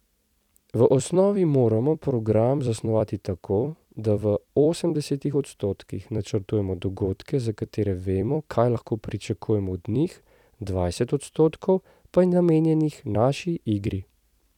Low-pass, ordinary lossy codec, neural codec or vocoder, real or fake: 19.8 kHz; none; vocoder, 44.1 kHz, 128 mel bands every 256 samples, BigVGAN v2; fake